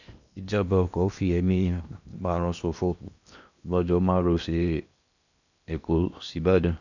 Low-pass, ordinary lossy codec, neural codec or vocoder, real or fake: 7.2 kHz; none; codec, 16 kHz in and 24 kHz out, 0.8 kbps, FocalCodec, streaming, 65536 codes; fake